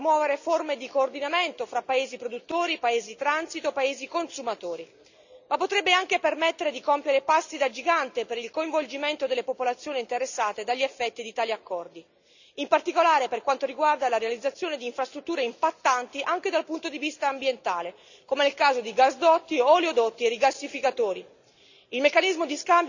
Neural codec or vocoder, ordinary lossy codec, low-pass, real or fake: none; none; 7.2 kHz; real